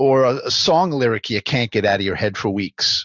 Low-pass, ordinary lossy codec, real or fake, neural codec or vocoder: 7.2 kHz; Opus, 64 kbps; real; none